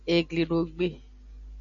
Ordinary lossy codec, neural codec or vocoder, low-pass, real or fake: MP3, 96 kbps; none; 7.2 kHz; real